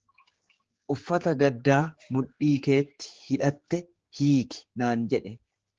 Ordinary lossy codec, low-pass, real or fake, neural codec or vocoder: Opus, 16 kbps; 7.2 kHz; fake; codec, 16 kHz, 4 kbps, X-Codec, HuBERT features, trained on general audio